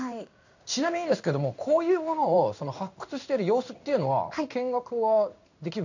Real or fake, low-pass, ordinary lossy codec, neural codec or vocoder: fake; 7.2 kHz; none; codec, 16 kHz in and 24 kHz out, 1 kbps, XY-Tokenizer